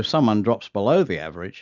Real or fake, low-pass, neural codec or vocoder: real; 7.2 kHz; none